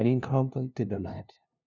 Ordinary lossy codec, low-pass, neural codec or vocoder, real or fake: none; 7.2 kHz; codec, 16 kHz, 0.5 kbps, FunCodec, trained on LibriTTS, 25 frames a second; fake